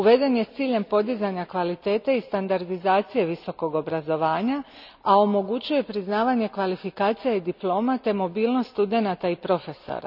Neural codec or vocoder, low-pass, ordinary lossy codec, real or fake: none; 5.4 kHz; none; real